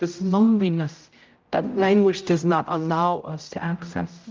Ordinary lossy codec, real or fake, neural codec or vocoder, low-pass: Opus, 24 kbps; fake; codec, 16 kHz, 0.5 kbps, X-Codec, HuBERT features, trained on general audio; 7.2 kHz